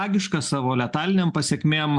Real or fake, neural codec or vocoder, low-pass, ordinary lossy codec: real; none; 10.8 kHz; MP3, 96 kbps